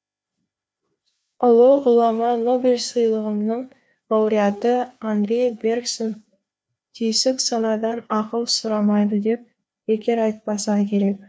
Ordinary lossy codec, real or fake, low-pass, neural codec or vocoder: none; fake; none; codec, 16 kHz, 2 kbps, FreqCodec, larger model